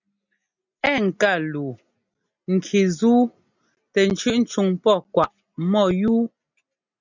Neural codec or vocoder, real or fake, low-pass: none; real; 7.2 kHz